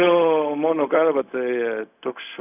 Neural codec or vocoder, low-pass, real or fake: codec, 16 kHz, 0.4 kbps, LongCat-Audio-Codec; 3.6 kHz; fake